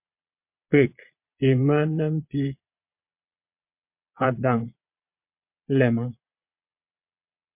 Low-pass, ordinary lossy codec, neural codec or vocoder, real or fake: 3.6 kHz; MP3, 32 kbps; vocoder, 22.05 kHz, 80 mel bands, Vocos; fake